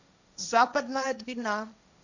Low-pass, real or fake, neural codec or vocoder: 7.2 kHz; fake; codec, 16 kHz, 1.1 kbps, Voila-Tokenizer